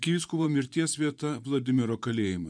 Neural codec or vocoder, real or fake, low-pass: vocoder, 24 kHz, 100 mel bands, Vocos; fake; 9.9 kHz